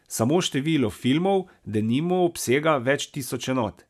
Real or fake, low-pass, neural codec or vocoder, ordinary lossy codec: real; 14.4 kHz; none; none